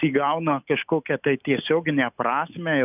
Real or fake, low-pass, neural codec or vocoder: real; 3.6 kHz; none